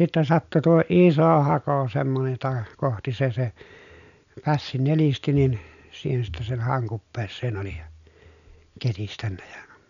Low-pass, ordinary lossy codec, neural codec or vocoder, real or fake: 7.2 kHz; none; none; real